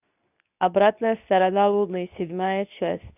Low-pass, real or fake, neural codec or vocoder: 3.6 kHz; fake; codec, 24 kHz, 0.9 kbps, WavTokenizer, medium speech release version 2